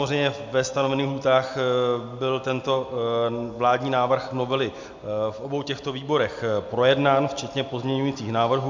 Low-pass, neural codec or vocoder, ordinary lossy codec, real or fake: 7.2 kHz; none; MP3, 64 kbps; real